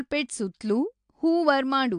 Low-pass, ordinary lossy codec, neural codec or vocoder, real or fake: 9.9 kHz; AAC, 64 kbps; none; real